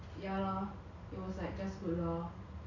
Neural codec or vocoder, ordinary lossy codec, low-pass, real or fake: none; none; 7.2 kHz; real